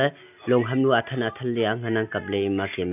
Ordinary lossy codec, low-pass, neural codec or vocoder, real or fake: none; 3.6 kHz; none; real